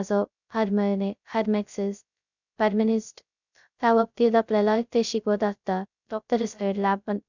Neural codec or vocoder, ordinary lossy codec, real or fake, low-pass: codec, 16 kHz, 0.2 kbps, FocalCodec; none; fake; 7.2 kHz